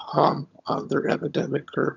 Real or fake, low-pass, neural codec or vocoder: fake; 7.2 kHz; vocoder, 22.05 kHz, 80 mel bands, HiFi-GAN